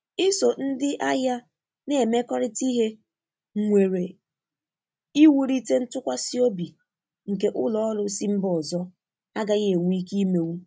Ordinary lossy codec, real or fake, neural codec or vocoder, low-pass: none; real; none; none